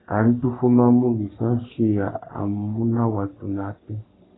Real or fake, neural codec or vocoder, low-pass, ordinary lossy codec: fake; codec, 44.1 kHz, 3.4 kbps, Pupu-Codec; 7.2 kHz; AAC, 16 kbps